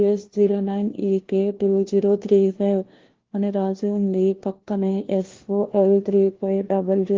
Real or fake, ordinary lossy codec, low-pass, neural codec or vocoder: fake; Opus, 16 kbps; 7.2 kHz; codec, 16 kHz, 1 kbps, FunCodec, trained on LibriTTS, 50 frames a second